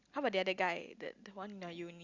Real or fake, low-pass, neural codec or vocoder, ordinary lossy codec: real; 7.2 kHz; none; Opus, 64 kbps